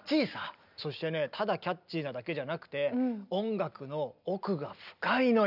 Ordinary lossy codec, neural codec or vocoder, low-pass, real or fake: none; none; 5.4 kHz; real